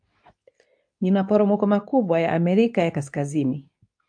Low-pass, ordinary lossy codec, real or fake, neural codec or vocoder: 9.9 kHz; AAC, 64 kbps; fake; codec, 24 kHz, 0.9 kbps, WavTokenizer, medium speech release version 2